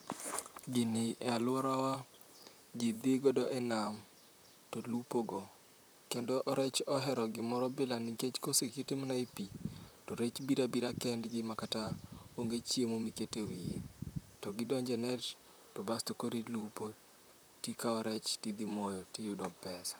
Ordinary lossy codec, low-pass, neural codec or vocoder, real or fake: none; none; vocoder, 44.1 kHz, 128 mel bands, Pupu-Vocoder; fake